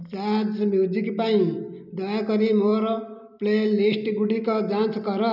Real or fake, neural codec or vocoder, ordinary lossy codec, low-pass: real; none; none; 5.4 kHz